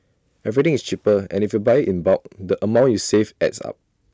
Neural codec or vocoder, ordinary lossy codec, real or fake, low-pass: none; none; real; none